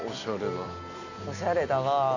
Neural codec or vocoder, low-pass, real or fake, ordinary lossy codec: none; 7.2 kHz; real; none